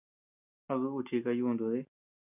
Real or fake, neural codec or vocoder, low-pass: real; none; 3.6 kHz